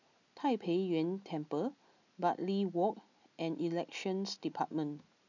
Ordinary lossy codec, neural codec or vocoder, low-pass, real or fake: none; none; 7.2 kHz; real